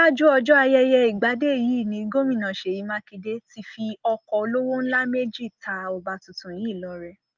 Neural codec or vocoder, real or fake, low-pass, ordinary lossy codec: none; real; 7.2 kHz; Opus, 32 kbps